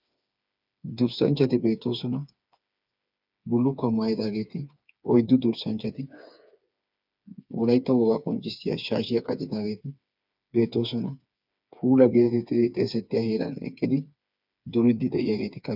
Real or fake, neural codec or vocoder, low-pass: fake; codec, 16 kHz, 4 kbps, FreqCodec, smaller model; 5.4 kHz